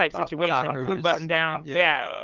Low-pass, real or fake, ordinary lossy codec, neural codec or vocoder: 7.2 kHz; fake; Opus, 32 kbps; codec, 16 kHz, 8 kbps, FunCodec, trained on LibriTTS, 25 frames a second